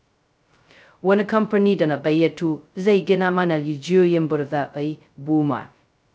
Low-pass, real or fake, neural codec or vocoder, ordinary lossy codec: none; fake; codec, 16 kHz, 0.2 kbps, FocalCodec; none